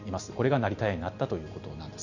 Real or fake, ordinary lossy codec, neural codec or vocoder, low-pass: real; none; none; 7.2 kHz